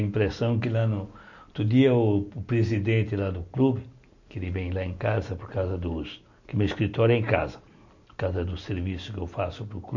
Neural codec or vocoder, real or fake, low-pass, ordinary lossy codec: none; real; 7.2 kHz; none